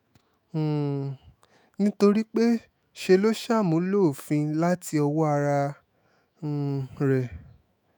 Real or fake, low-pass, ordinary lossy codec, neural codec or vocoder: fake; none; none; autoencoder, 48 kHz, 128 numbers a frame, DAC-VAE, trained on Japanese speech